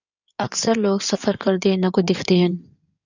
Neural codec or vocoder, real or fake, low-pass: codec, 16 kHz in and 24 kHz out, 2.2 kbps, FireRedTTS-2 codec; fake; 7.2 kHz